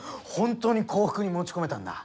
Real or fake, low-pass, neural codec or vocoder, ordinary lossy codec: real; none; none; none